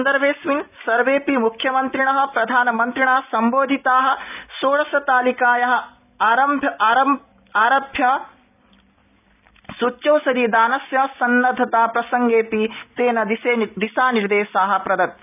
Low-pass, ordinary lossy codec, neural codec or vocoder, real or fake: 3.6 kHz; none; none; real